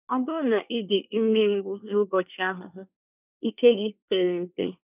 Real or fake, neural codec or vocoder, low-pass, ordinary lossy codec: fake; codec, 24 kHz, 1 kbps, SNAC; 3.6 kHz; none